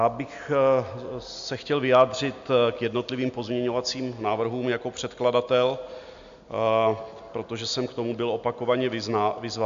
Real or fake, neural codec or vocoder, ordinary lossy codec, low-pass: real; none; MP3, 64 kbps; 7.2 kHz